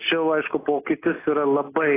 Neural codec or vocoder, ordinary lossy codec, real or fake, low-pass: none; AAC, 16 kbps; real; 3.6 kHz